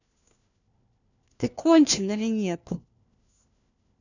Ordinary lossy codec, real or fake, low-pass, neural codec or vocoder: none; fake; 7.2 kHz; codec, 16 kHz, 1 kbps, FunCodec, trained on LibriTTS, 50 frames a second